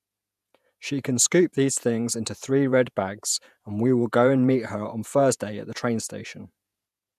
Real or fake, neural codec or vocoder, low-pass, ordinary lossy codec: fake; vocoder, 48 kHz, 128 mel bands, Vocos; 14.4 kHz; none